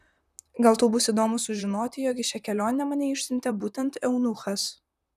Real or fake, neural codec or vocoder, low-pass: fake; vocoder, 44.1 kHz, 128 mel bands, Pupu-Vocoder; 14.4 kHz